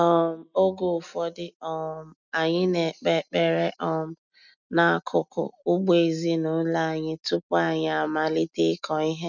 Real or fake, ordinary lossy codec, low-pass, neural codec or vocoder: real; none; 7.2 kHz; none